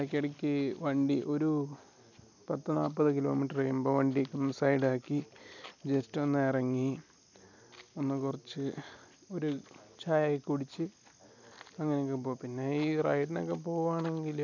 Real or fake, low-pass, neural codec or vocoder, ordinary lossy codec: real; 7.2 kHz; none; none